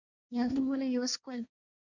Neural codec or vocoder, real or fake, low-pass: codec, 16 kHz, 1.1 kbps, Voila-Tokenizer; fake; 7.2 kHz